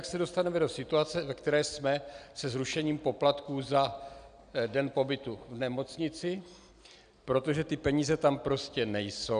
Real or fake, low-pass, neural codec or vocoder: real; 9.9 kHz; none